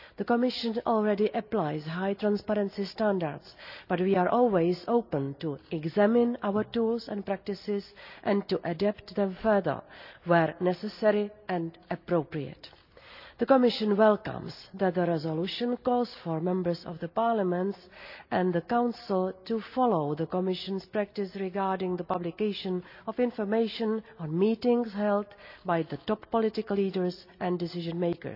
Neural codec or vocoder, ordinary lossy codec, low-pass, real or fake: none; none; 5.4 kHz; real